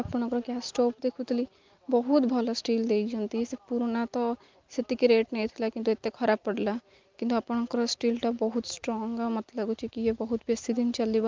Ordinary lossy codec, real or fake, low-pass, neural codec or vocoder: Opus, 24 kbps; real; 7.2 kHz; none